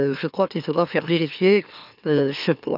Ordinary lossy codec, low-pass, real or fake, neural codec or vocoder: none; 5.4 kHz; fake; autoencoder, 44.1 kHz, a latent of 192 numbers a frame, MeloTTS